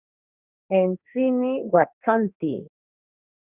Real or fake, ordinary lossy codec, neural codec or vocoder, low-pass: fake; Opus, 64 kbps; codec, 44.1 kHz, 2.6 kbps, SNAC; 3.6 kHz